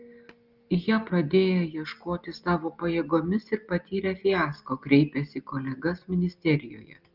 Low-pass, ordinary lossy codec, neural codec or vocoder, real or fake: 5.4 kHz; Opus, 24 kbps; none; real